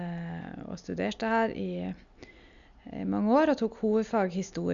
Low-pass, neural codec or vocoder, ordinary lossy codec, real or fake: 7.2 kHz; none; none; real